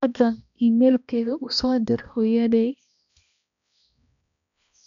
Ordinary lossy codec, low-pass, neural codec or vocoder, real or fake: none; 7.2 kHz; codec, 16 kHz, 1 kbps, X-Codec, HuBERT features, trained on balanced general audio; fake